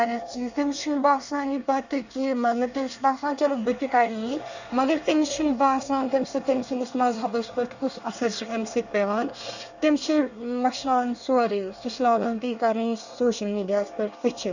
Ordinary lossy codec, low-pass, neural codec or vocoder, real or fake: none; 7.2 kHz; codec, 24 kHz, 1 kbps, SNAC; fake